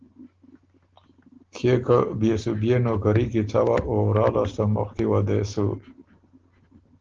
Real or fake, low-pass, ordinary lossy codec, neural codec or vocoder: real; 7.2 kHz; Opus, 16 kbps; none